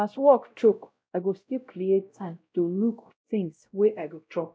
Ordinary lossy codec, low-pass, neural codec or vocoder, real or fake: none; none; codec, 16 kHz, 0.5 kbps, X-Codec, WavLM features, trained on Multilingual LibriSpeech; fake